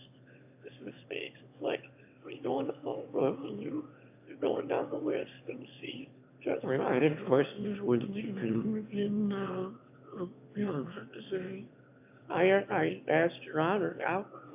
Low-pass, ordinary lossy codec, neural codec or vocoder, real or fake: 3.6 kHz; AAC, 32 kbps; autoencoder, 22.05 kHz, a latent of 192 numbers a frame, VITS, trained on one speaker; fake